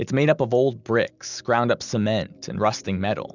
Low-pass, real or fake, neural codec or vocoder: 7.2 kHz; real; none